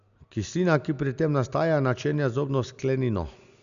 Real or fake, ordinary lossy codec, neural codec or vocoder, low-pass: real; none; none; 7.2 kHz